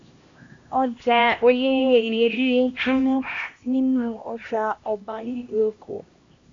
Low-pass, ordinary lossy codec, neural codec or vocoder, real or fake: 7.2 kHz; AAC, 48 kbps; codec, 16 kHz, 1 kbps, X-Codec, HuBERT features, trained on LibriSpeech; fake